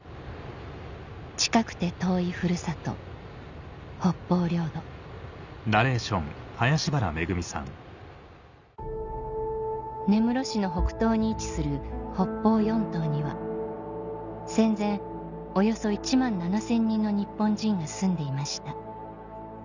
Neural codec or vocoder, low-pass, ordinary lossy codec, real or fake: none; 7.2 kHz; none; real